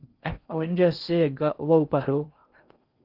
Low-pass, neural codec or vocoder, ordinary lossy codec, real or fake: 5.4 kHz; codec, 16 kHz in and 24 kHz out, 0.6 kbps, FocalCodec, streaming, 4096 codes; Opus, 32 kbps; fake